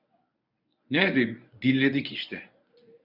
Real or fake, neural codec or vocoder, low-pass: fake; codec, 24 kHz, 0.9 kbps, WavTokenizer, medium speech release version 1; 5.4 kHz